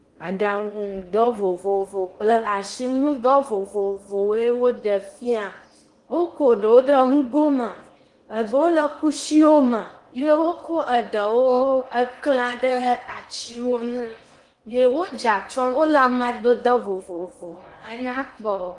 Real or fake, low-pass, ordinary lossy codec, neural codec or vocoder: fake; 10.8 kHz; Opus, 24 kbps; codec, 16 kHz in and 24 kHz out, 0.8 kbps, FocalCodec, streaming, 65536 codes